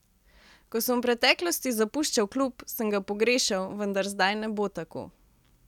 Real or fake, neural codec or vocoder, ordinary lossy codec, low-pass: real; none; none; 19.8 kHz